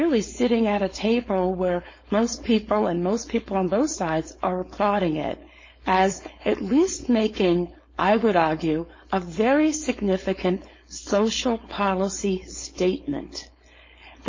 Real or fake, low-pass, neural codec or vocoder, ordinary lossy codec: fake; 7.2 kHz; codec, 16 kHz, 4.8 kbps, FACodec; MP3, 32 kbps